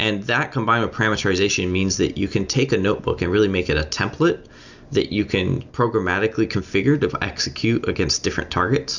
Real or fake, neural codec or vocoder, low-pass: real; none; 7.2 kHz